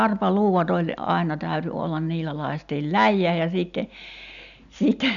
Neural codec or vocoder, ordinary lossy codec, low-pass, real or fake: none; none; 7.2 kHz; real